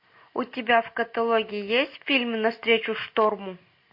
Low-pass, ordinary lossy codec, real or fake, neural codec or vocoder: 5.4 kHz; MP3, 32 kbps; real; none